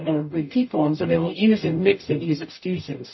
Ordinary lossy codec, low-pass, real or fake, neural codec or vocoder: MP3, 24 kbps; 7.2 kHz; fake; codec, 44.1 kHz, 0.9 kbps, DAC